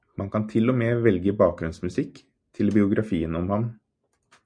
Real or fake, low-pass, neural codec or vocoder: real; 9.9 kHz; none